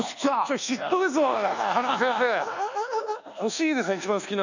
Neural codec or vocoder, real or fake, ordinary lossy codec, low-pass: codec, 24 kHz, 1.2 kbps, DualCodec; fake; none; 7.2 kHz